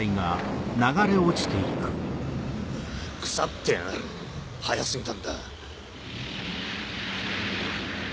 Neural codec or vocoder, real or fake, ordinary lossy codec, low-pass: none; real; none; none